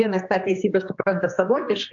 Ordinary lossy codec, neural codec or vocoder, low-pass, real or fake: AAC, 64 kbps; codec, 16 kHz, 2 kbps, X-Codec, HuBERT features, trained on general audio; 7.2 kHz; fake